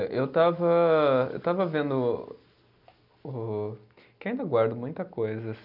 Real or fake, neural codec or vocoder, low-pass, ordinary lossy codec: real; none; 5.4 kHz; none